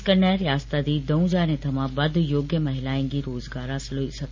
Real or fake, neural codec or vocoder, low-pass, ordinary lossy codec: real; none; 7.2 kHz; MP3, 64 kbps